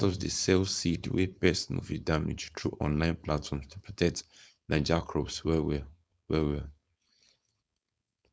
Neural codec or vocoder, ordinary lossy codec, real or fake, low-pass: codec, 16 kHz, 4.8 kbps, FACodec; none; fake; none